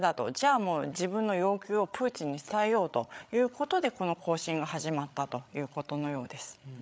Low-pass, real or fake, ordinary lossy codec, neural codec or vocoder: none; fake; none; codec, 16 kHz, 8 kbps, FreqCodec, larger model